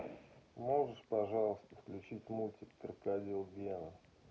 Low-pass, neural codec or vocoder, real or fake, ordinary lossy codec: none; none; real; none